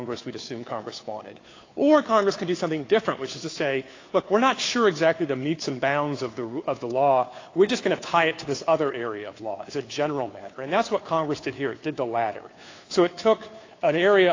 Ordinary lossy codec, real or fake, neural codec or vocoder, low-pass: AAC, 32 kbps; fake; codec, 16 kHz, 2 kbps, FunCodec, trained on Chinese and English, 25 frames a second; 7.2 kHz